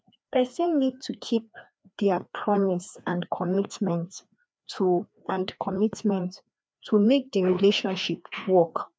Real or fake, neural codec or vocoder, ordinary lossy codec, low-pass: fake; codec, 16 kHz, 2 kbps, FreqCodec, larger model; none; none